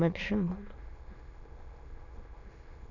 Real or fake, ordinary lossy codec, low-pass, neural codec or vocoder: fake; none; 7.2 kHz; autoencoder, 22.05 kHz, a latent of 192 numbers a frame, VITS, trained on many speakers